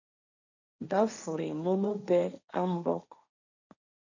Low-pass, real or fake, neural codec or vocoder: 7.2 kHz; fake; codec, 16 kHz, 1.1 kbps, Voila-Tokenizer